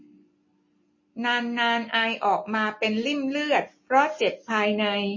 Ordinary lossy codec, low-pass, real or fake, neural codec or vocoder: MP3, 32 kbps; 7.2 kHz; real; none